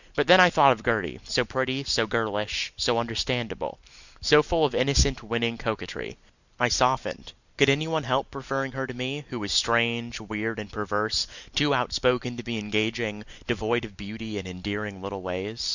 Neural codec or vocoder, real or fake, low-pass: none; real; 7.2 kHz